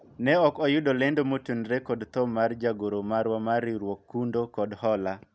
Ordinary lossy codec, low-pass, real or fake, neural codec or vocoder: none; none; real; none